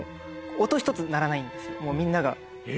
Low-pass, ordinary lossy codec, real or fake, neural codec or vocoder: none; none; real; none